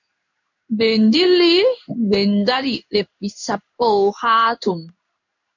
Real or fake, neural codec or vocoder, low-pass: fake; codec, 16 kHz in and 24 kHz out, 1 kbps, XY-Tokenizer; 7.2 kHz